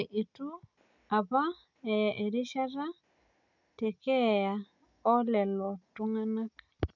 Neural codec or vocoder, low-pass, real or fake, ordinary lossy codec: none; 7.2 kHz; real; none